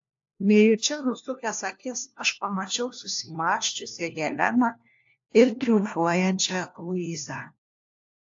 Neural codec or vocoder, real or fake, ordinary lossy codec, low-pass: codec, 16 kHz, 1 kbps, FunCodec, trained on LibriTTS, 50 frames a second; fake; AAC, 48 kbps; 7.2 kHz